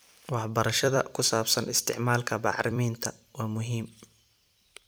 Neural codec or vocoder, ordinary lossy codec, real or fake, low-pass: vocoder, 44.1 kHz, 128 mel bands every 256 samples, BigVGAN v2; none; fake; none